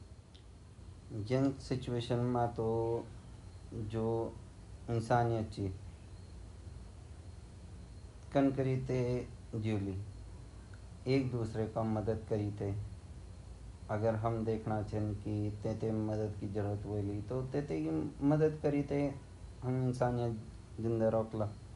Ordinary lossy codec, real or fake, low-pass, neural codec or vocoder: none; real; 10.8 kHz; none